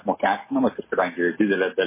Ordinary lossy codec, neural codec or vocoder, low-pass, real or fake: MP3, 16 kbps; none; 3.6 kHz; real